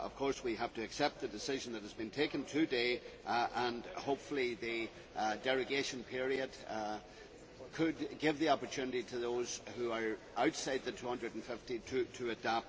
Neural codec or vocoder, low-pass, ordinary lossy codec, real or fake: none; none; none; real